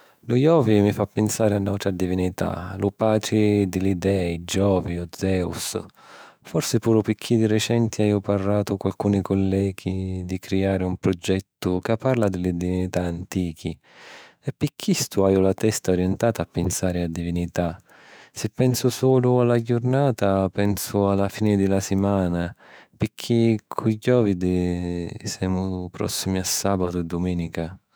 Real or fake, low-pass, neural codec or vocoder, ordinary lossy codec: fake; none; autoencoder, 48 kHz, 128 numbers a frame, DAC-VAE, trained on Japanese speech; none